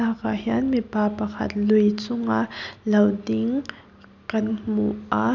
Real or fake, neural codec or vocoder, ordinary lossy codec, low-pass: real; none; none; 7.2 kHz